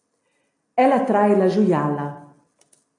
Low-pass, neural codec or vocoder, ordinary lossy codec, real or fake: 10.8 kHz; none; AAC, 48 kbps; real